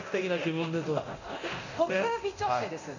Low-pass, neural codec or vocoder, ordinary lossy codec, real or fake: 7.2 kHz; codec, 24 kHz, 0.9 kbps, DualCodec; none; fake